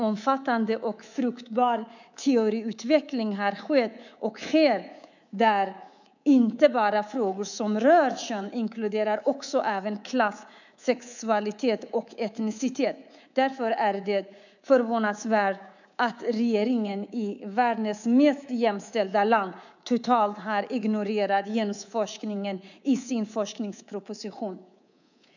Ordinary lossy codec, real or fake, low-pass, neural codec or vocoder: none; fake; 7.2 kHz; codec, 24 kHz, 3.1 kbps, DualCodec